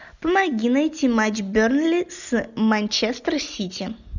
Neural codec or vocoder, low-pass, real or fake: none; 7.2 kHz; real